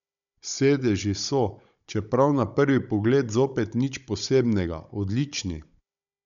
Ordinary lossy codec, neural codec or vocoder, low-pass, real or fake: none; codec, 16 kHz, 16 kbps, FunCodec, trained on Chinese and English, 50 frames a second; 7.2 kHz; fake